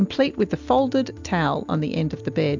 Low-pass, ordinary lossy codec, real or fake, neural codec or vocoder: 7.2 kHz; MP3, 64 kbps; real; none